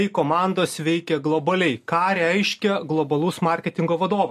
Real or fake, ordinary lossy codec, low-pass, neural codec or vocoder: real; MP3, 64 kbps; 14.4 kHz; none